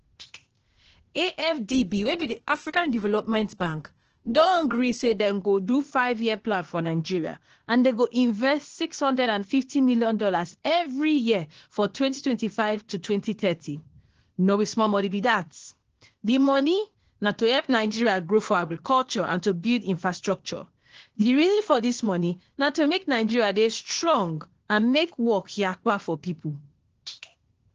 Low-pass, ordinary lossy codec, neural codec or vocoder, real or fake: 7.2 kHz; Opus, 16 kbps; codec, 16 kHz, 0.8 kbps, ZipCodec; fake